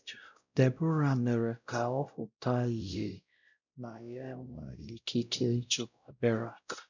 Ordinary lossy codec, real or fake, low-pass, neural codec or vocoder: none; fake; 7.2 kHz; codec, 16 kHz, 0.5 kbps, X-Codec, WavLM features, trained on Multilingual LibriSpeech